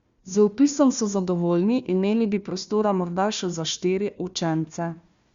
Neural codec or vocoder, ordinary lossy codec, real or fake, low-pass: codec, 16 kHz, 1 kbps, FunCodec, trained on Chinese and English, 50 frames a second; Opus, 64 kbps; fake; 7.2 kHz